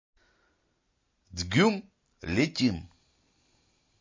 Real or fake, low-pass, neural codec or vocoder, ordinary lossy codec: real; 7.2 kHz; none; MP3, 32 kbps